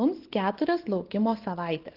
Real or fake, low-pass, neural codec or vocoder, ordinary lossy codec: real; 5.4 kHz; none; Opus, 16 kbps